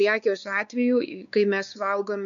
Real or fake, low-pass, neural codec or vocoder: fake; 7.2 kHz; codec, 16 kHz, 4 kbps, X-Codec, WavLM features, trained on Multilingual LibriSpeech